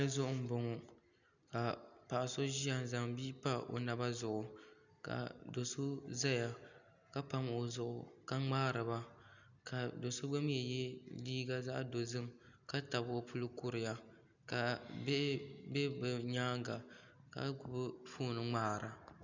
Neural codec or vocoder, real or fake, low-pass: none; real; 7.2 kHz